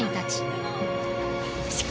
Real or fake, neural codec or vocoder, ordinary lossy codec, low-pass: real; none; none; none